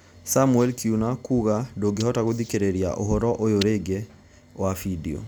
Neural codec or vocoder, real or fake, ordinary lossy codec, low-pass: none; real; none; none